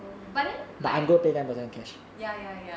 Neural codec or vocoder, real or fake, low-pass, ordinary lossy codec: none; real; none; none